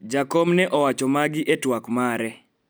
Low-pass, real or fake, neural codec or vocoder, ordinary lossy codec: none; real; none; none